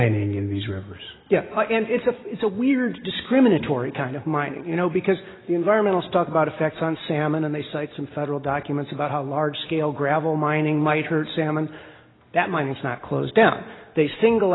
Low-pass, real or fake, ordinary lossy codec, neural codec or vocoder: 7.2 kHz; real; AAC, 16 kbps; none